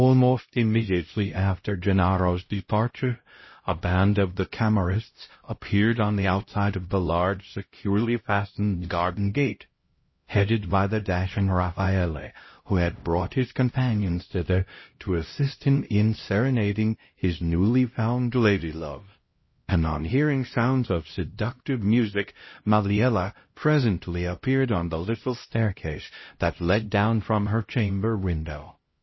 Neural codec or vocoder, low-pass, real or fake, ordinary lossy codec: codec, 16 kHz, 0.5 kbps, X-Codec, HuBERT features, trained on LibriSpeech; 7.2 kHz; fake; MP3, 24 kbps